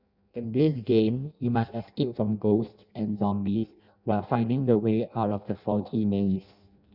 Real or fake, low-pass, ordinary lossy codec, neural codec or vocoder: fake; 5.4 kHz; none; codec, 16 kHz in and 24 kHz out, 0.6 kbps, FireRedTTS-2 codec